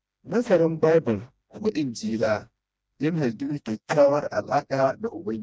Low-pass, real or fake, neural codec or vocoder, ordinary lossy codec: none; fake; codec, 16 kHz, 1 kbps, FreqCodec, smaller model; none